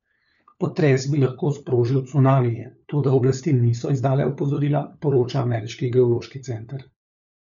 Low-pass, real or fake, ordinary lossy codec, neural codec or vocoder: 7.2 kHz; fake; none; codec, 16 kHz, 4 kbps, FunCodec, trained on LibriTTS, 50 frames a second